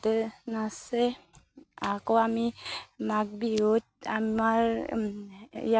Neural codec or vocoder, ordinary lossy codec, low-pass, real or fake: none; none; none; real